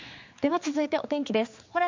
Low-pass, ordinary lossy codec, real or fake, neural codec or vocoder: 7.2 kHz; MP3, 64 kbps; fake; codec, 16 kHz, 4 kbps, X-Codec, HuBERT features, trained on general audio